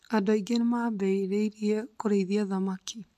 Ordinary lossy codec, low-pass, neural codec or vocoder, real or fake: MP3, 64 kbps; 14.4 kHz; none; real